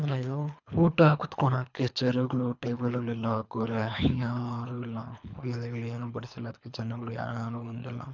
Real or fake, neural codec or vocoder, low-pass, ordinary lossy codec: fake; codec, 24 kHz, 3 kbps, HILCodec; 7.2 kHz; none